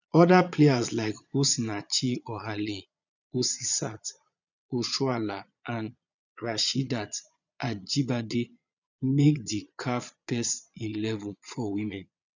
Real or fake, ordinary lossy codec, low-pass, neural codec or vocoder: fake; none; 7.2 kHz; vocoder, 22.05 kHz, 80 mel bands, Vocos